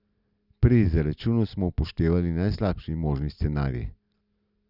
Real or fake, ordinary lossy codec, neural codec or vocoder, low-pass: real; none; none; 5.4 kHz